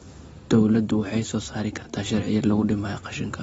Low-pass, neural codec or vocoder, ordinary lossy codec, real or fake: 10.8 kHz; none; AAC, 24 kbps; real